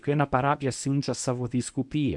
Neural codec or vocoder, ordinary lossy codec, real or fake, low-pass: codec, 24 kHz, 0.9 kbps, WavTokenizer, medium speech release version 1; MP3, 96 kbps; fake; 10.8 kHz